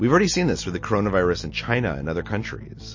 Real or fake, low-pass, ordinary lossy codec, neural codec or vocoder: real; 7.2 kHz; MP3, 32 kbps; none